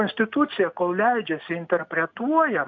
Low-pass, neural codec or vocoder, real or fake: 7.2 kHz; none; real